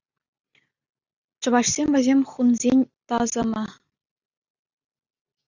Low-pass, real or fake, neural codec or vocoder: 7.2 kHz; real; none